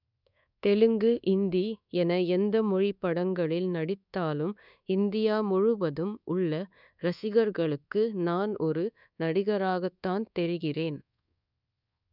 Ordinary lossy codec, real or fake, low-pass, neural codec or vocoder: none; fake; 5.4 kHz; codec, 24 kHz, 1.2 kbps, DualCodec